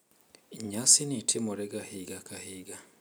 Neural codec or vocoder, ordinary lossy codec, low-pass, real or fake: none; none; none; real